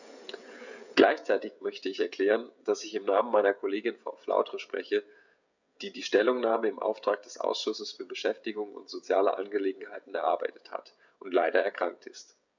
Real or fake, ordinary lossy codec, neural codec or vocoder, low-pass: fake; none; codec, 16 kHz, 16 kbps, FreqCodec, smaller model; 7.2 kHz